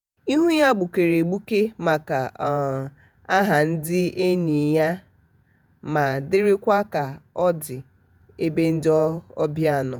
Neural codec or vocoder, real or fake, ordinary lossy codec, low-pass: vocoder, 48 kHz, 128 mel bands, Vocos; fake; none; none